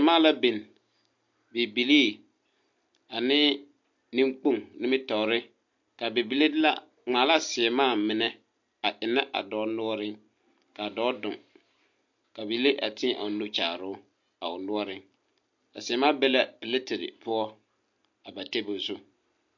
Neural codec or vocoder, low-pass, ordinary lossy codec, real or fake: none; 7.2 kHz; MP3, 48 kbps; real